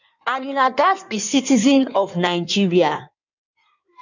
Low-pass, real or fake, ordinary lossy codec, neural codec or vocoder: 7.2 kHz; fake; none; codec, 16 kHz in and 24 kHz out, 1.1 kbps, FireRedTTS-2 codec